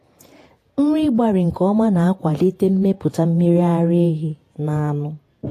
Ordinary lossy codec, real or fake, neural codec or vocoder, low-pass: AAC, 48 kbps; fake; vocoder, 48 kHz, 128 mel bands, Vocos; 14.4 kHz